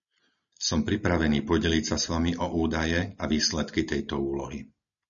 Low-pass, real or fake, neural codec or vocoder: 7.2 kHz; real; none